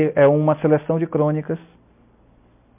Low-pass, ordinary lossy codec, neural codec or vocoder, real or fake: 3.6 kHz; MP3, 32 kbps; none; real